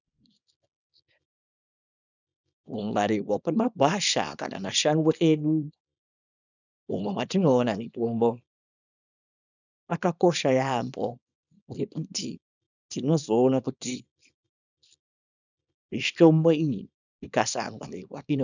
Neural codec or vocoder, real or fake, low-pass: codec, 24 kHz, 0.9 kbps, WavTokenizer, small release; fake; 7.2 kHz